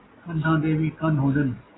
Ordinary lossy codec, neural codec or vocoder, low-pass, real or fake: AAC, 16 kbps; none; 7.2 kHz; real